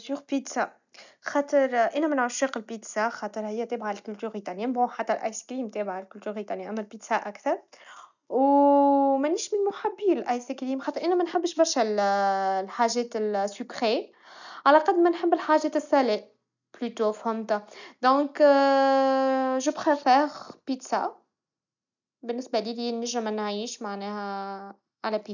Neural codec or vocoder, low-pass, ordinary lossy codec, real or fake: none; 7.2 kHz; none; real